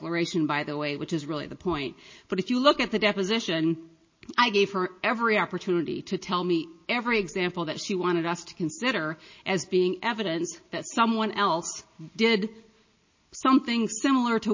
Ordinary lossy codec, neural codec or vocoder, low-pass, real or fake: MP3, 32 kbps; none; 7.2 kHz; real